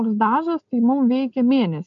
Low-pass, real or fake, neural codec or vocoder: 7.2 kHz; real; none